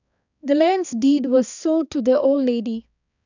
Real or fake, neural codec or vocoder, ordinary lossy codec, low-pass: fake; codec, 16 kHz, 2 kbps, X-Codec, HuBERT features, trained on balanced general audio; none; 7.2 kHz